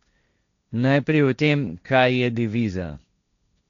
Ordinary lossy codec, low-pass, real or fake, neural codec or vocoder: none; 7.2 kHz; fake; codec, 16 kHz, 1.1 kbps, Voila-Tokenizer